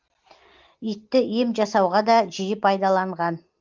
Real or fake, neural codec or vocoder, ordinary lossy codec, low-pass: real; none; Opus, 32 kbps; 7.2 kHz